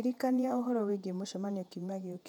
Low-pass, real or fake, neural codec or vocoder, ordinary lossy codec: 19.8 kHz; fake; vocoder, 48 kHz, 128 mel bands, Vocos; none